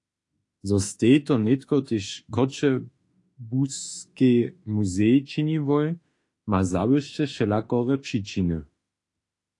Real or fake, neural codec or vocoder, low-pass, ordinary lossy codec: fake; autoencoder, 48 kHz, 32 numbers a frame, DAC-VAE, trained on Japanese speech; 10.8 kHz; AAC, 48 kbps